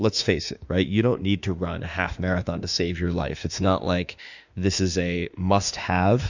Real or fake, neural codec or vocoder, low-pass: fake; autoencoder, 48 kHz, 32 numbers a frame, DAC-VAE, trained on Japanese speech; 7.2 kHz